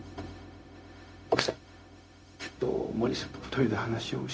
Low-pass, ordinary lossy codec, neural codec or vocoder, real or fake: none; none; codec, 16 kHz, 0.4 kbps, LongCat-Audio-Codec; fake